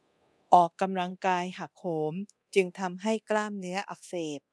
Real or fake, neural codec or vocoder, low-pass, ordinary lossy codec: fake; codec, 24 kHz, 0.9 kbps, DualCodec; none; none